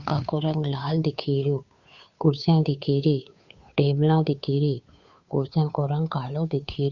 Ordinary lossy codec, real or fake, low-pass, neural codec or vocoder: Opus, 64 kbps; fake; 7.2 kHz; codec, 16 kHz, 2 kbps, FunCodec, trained on Chinese and English, 25 frames a second